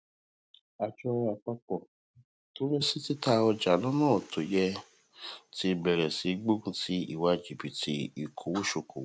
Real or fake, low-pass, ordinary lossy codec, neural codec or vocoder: real; none; none; none